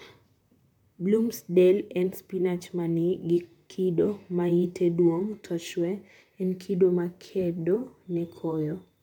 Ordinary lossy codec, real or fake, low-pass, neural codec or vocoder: none; fake; 19.8 kHz; vocoder, 44.1 kHz, 128 mel bands, Pupu-Vocoder